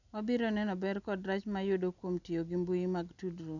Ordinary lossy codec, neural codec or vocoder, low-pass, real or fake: none; none; 7.2 kHz; real